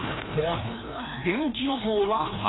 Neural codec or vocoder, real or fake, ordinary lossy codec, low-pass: codec, 16 kHz, 1 kbps, FreqCodec, larger model; fake; AAC, 16 kbps; 7.2 kHz